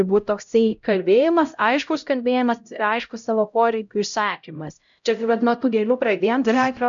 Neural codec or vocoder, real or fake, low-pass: codec, 16 kHz, 0.5 kbps, X-Codec, HuBERT features, trained on LibriSpeech; fake; 7.2 kHz